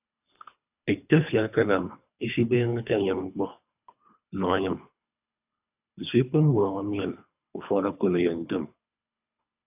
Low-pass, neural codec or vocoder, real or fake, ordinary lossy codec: 3.6 kHz; codec, 24 kHz, 3 kbps, HILCodec; fake; AAC, 32 kbps